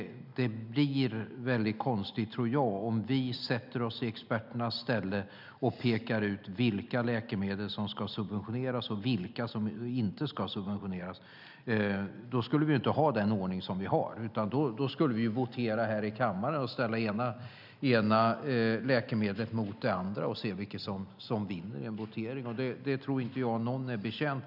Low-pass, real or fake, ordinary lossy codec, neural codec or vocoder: 5.4 kHz; real; none; none